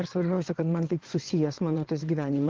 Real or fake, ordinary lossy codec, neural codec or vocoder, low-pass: fake; Opus, 16 kbps; vocoder, 22.05 kHz, 80 mel bands, WaveNeXt; 7.2 kHz